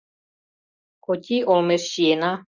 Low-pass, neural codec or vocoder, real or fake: 7.2 kHz; none; real